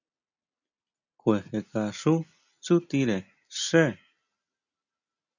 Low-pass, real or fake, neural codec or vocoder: 7.2 kHz; real; none